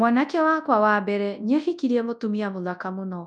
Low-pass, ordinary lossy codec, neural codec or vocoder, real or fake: none; none; codec, 24 kHz, 0.9 kbps, WavTokenizer, large speech release; fake